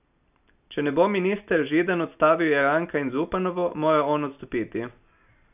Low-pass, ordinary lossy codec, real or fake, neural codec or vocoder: 3.6 kHz; none; real; none